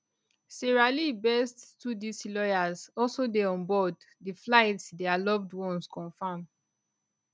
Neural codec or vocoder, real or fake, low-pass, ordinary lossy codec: none; real; none; none